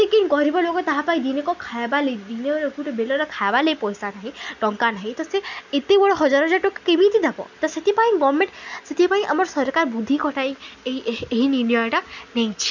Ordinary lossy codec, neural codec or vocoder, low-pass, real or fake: none; none; 7.2 kHz; real